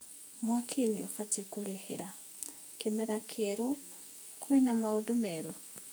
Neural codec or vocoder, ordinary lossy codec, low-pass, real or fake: codec, 44.1 kHz, 2.6 kbps, SNAC; none; none; fake